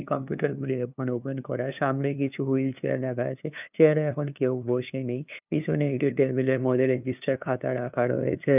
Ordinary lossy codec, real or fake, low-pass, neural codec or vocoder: none; fake; 3.6 kHz; codec, 16 kHz, 2 kbps, FunCodec, trained on LibriTTS, 25 frames a second